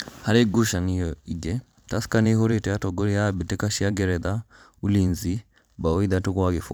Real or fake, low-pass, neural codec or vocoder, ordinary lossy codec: fake; none; vocoder, 44.1 kHz, 128 mel bands every 512 samples, BigVGAN v2; none